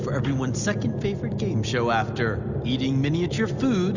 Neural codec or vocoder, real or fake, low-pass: none; real; 7.2 kHz